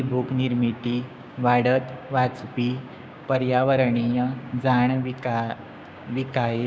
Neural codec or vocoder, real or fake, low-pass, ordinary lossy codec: codec, 16 kHz, 6 kbps, DAC; fake; none; none